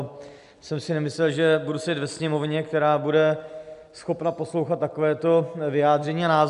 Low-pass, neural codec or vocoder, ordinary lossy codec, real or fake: 10.8 kHz; none; AAC, 96 kbps; real